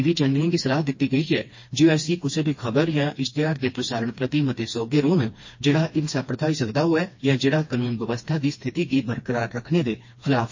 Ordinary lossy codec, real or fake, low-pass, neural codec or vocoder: MP3, 32 kbps; fake; 7.2 kHz; codec, 16 kHz, 2 kbps, FreqCodec, smaller model